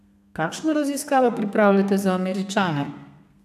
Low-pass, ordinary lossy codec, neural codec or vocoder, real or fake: 14.4 kHz; none; codec, 32 kHz, 1.9 kbps, SNAC; fake